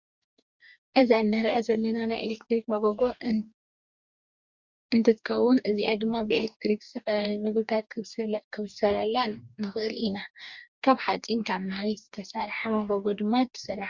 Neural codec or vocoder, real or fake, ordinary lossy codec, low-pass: codec, 44.1 kHz, 2.6 kbps, DAC; fake; Opus, 64 kbps; 7.2 kHz